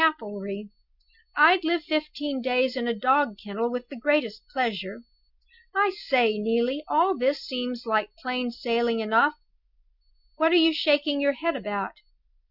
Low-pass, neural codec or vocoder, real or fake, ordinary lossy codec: 5.4 kHz; none; real; Opus, 64 kbps